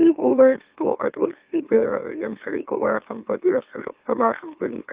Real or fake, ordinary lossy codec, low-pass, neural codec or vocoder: fake; Opus, 32 kbps; 3.6 kHz; autoencoder, 44.1 kHz, a latent of 192 numbers a frame, MeloTTS